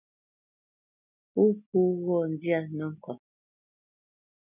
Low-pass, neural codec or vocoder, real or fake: 3.6 kHz; none; real